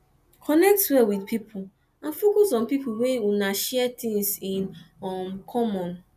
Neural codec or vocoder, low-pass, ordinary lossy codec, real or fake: none; 14.4 kHz; none; real